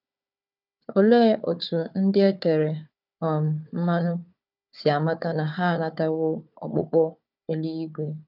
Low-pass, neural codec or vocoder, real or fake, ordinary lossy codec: 5.4 kHz; codec, 16 kHz, 4 kbps, FunCodec, trained on Chinese and English, 50 frames a second; fake; none